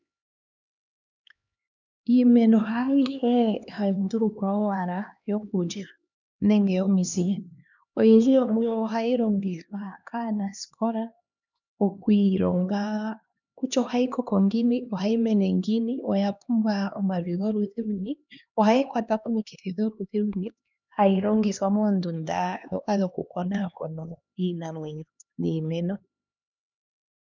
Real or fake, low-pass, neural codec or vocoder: fake; 7.2 kHz; codec, 16 kHz, 2 kbps, X-Codec, HuBERT features, trained on LibriSpeech